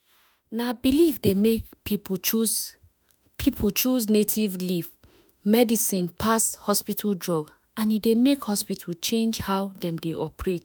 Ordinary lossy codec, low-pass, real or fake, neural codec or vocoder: none; none; fake; autoencoder, 48 kHz, 32 numbers a frame, DAC-VAE, trained on Japanese speech